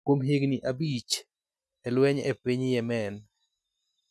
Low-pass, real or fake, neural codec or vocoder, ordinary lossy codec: none; real; none; none